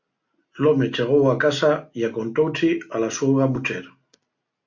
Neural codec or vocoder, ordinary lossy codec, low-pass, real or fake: none; MP3, 48 kbps; 7.2 kHz; real